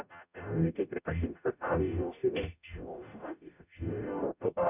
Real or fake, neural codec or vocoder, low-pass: fake; codec, 44.1 kHz, 0.9 kbps, DAC; 3.6 kHz